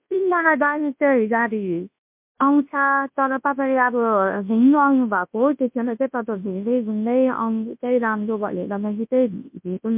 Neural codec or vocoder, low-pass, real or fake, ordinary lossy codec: codec, 24 kHz, 0.9 kbps, WavTokenizer, large speech release; 3.6 kHz; fake; MP3, 32 kbps